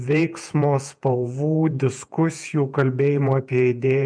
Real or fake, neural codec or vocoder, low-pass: fake; vocoder, 48 kHz, 128 mel bands, Vocos; 9.9 kHz